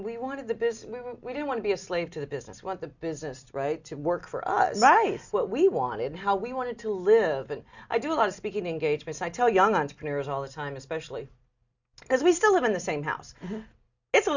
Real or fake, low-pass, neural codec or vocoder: real; 7.2 kHz; none